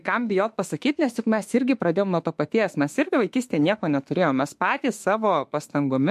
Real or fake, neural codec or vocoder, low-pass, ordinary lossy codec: fake; autoencoder, 48 kHz, 32 numbers a frame, DAC-VAE, trained on Japanese speech; 14.4 kHz; MP3, 64 kbps